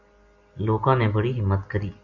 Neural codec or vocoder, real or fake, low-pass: none; real; 7.2 kHz